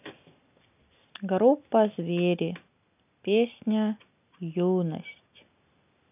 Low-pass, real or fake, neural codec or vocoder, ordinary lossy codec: 3.6 kHz; real; none; none